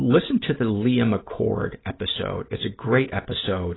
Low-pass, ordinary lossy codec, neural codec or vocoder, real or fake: 7.2 kHz; AAC, 16 kbps; none; real